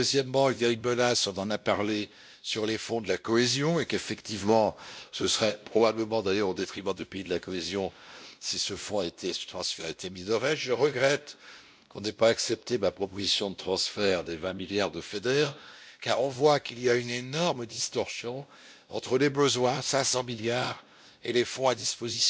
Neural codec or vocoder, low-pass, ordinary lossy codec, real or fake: codec, 16 kHz, 1 kbps, X-Codec, WavLM features, trained on Multilingual LibriSpeech; none; none; fake